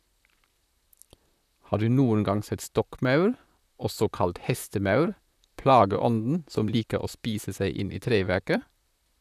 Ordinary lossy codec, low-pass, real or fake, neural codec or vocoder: none; 14.4 kHz; fake; vocoder, 44.1 kHz, 128 mel bands, Pupu-Vocoder